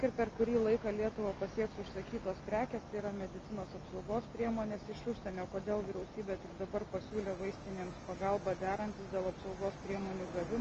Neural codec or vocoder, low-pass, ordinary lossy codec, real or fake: none; 7.2 kHz; Opus, 24 kbps; real